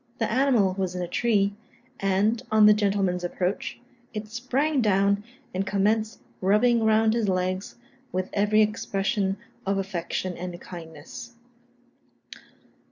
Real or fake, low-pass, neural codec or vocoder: real; 7.2 kHz; none